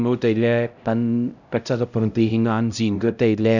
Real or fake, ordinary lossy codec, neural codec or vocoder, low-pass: fake; none; codec, 16 kHz, 0.5 kbps, X-Codec, HuBERT features, trained on LibriSpeech; 7.2 kHz